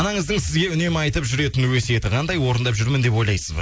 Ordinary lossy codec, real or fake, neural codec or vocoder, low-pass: none; real; none; none